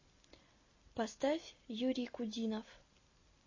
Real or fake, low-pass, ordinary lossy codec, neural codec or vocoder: real; 7.2 kHz; MP3, 32 kbps; none